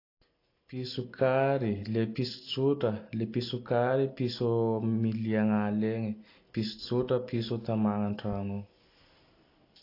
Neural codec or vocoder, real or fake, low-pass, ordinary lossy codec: none; real; 5.4 kHz; AAC, 32 kbps